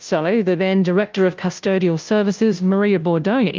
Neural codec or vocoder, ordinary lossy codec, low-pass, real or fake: codec, 16 kHz, 0.5 kbps, FunCodec, trained on Chinese and English, 25 frames a second; Opus, 24 kbps; 7.2 kHz; fake